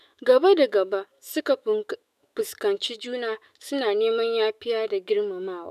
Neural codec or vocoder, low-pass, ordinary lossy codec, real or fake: autoencoder, 48 kHz, 128 numbers a frame, DAC-VAE, trained on Japanese speech; 14.4 kHz; none; fake